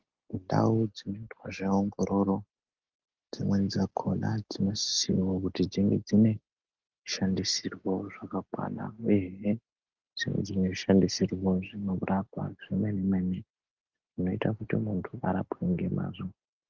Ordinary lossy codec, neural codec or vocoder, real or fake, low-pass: Opus, 24 kbps; none; real; 7.2 kHz